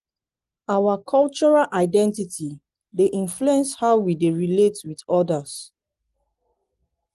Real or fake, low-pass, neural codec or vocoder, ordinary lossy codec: real; 10.8 kHz; none; Opus, 24 kbps